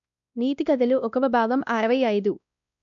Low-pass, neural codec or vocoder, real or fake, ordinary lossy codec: 7.2 kHz; codec, 16 kHz, 1 kbps, X-Codec, WavLM features, trained on Multilingual LibriSpeech; fake; none